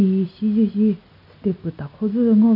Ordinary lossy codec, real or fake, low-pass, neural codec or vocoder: none; real; 5.4 kHz; none